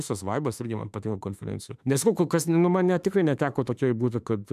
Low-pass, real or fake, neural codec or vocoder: 14.4 kHz; fake; autoencoder, 48 kHz, 32 numbers a frame, DAC-VAE, trained on Japanese speech